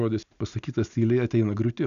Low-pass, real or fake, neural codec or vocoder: 7.2 kHz; real; none